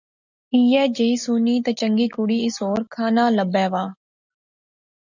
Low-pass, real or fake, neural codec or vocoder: 7.2 kHz; real; none